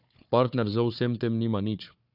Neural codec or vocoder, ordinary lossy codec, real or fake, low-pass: codec, 16 kHz, 4 kbps, FunCodec, trained on Chinese and English, 50 frames a second; none; fake; 5.4 kHz